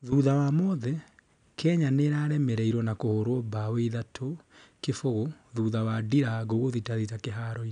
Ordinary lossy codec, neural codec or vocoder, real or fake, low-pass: none; none; real; 9.9 kHz